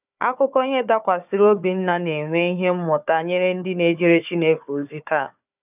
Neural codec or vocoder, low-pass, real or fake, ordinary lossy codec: codec, 16 kHz, 4 kbps, FunCodec, trained on Chinese and English, 50 frames a second; 3.6 kHz; fake; none